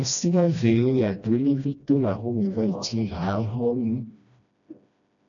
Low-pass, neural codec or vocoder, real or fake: 7.2 kHz; codec, 16 kHz, 1 kbps, FreqCodec, smaller model; fake